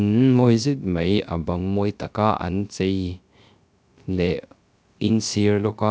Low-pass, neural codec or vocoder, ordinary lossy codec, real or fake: none; codec, 16 kHz, 0.3 kbps, FocalCodec; none; fake